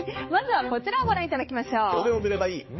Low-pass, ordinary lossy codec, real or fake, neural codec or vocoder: 7.2 kHz; MP3, 24 kbps; fake; codec, 16 kHz, 4 kbps, X-Codec, HuBERT features, trained on balanced general audio